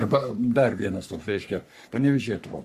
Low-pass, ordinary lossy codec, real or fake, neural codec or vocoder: 14.4 kHz; Opus, 64 kbps; fake; codec, 44.1 kHz, 3.4 kbps, Pupu-Codec